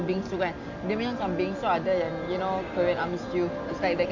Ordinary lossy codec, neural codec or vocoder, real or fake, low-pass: none; none; real; 7.2 kHz